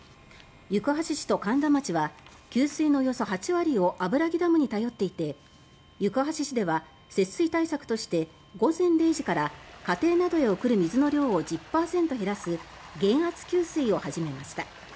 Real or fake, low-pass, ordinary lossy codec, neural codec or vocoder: real; none; none; none